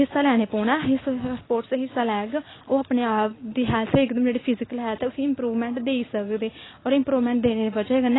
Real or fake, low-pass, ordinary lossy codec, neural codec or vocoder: real; 7.2 kHz; AAC, 16 kbps; none